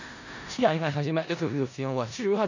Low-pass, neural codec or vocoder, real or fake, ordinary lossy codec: 7.2 kHz; codec, 16 kHz in and 24 kHz out, 0.4 kbps, LongCat-Audio-Codec, four codebook decoder; fake; none